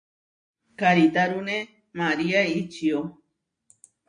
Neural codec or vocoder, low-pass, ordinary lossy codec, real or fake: none; 9.9 kHz; AAC, 64 kbps; real